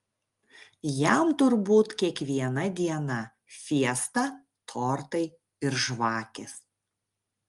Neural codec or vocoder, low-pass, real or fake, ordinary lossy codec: none; 10.8 kHz; real; Opus, 32 kbps